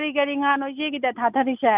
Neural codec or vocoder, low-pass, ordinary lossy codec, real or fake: none; 3.6 kHz; none; real